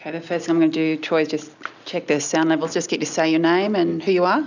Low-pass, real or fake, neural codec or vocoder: 7.2 kHz; real; none